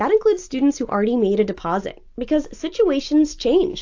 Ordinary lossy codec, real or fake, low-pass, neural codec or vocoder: AAC, 48 kbps; real; 7.2 kHz; none